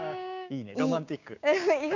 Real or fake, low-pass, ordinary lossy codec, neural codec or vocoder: fake; 7.2 kHz; none; codec, 16 kHz, 6 kbps, DAC